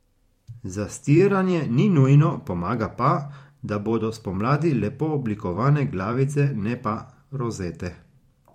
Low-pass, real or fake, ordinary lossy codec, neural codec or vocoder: 19.8 kHz; fake; MP3, 64 kbps; vocoder, 44.1 kHz, 128 mel bands every 512 samples, BigVGAN v2